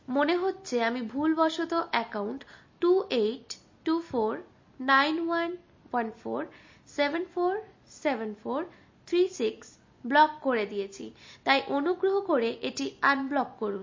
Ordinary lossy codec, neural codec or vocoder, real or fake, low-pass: MP3, 32 kbps; none; real; 7.2 kHz